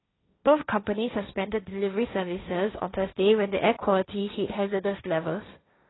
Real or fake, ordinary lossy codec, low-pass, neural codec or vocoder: fake; AAC, 16 kbps; 7.2 kHz; codec, 16 kHz, 1.1 kbps, Voila-Tokenizer